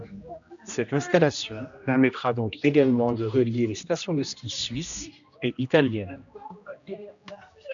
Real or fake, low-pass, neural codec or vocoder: fake; 7.2 kHz; codec, 16 kHz, 1 kbps, X-Codec, HuBERT features, trained on general audio